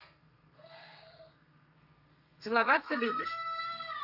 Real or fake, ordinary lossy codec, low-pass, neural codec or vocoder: fake; Opus, 64 kbps; 5.4 kHz; codec, 32 kHz, 1.9 kbps, SNAC